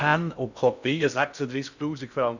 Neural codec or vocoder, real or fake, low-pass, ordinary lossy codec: codec, 16 kHz in and 24 kHz out, 0.6 kbps, FocalCodec, streaming, 2048 codes; fake; 7.2 kHz; none